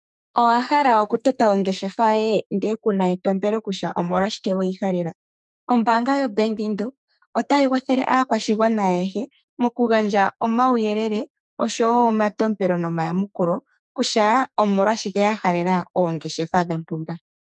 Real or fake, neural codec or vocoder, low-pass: fake; codec, 44.1 kHz, 2.6 kbps, SNAC; 10.8 kHz